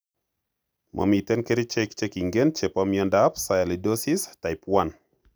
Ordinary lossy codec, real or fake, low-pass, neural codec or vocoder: none; real; none; none